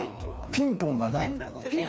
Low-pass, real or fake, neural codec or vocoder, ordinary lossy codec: none; fake; codec, 16 kHz, 2 kbps, FreqCodec, larger model; none